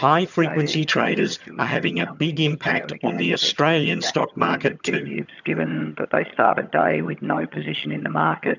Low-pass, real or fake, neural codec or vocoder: 7.2 kHz; fake; vocoder, 22.05 kHz, 80 mel bands, HiFi-GAN